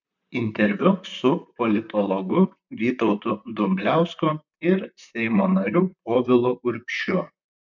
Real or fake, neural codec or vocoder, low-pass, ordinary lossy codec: fake; vocoder, 44.1 kHz, 128 mel bands, Pupu-Vocoder; 7.2 kHz; MP3, 64 kbps